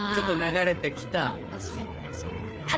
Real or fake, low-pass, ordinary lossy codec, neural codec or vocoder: fake; none; none; codec, 16 kHz, 4 kbps, FreqCodec, larger model